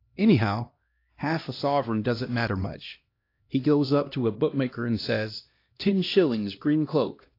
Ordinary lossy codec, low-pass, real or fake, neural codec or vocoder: AAC, 32 kbps; 5.4 kHz; fake; codec, 16 kHz, 1 kbps, X-Codec, HuBERT features, trained on LibriSpeech